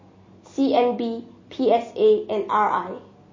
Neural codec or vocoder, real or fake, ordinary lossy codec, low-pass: none; real; MP3, 32 kbps; 7.2 kHz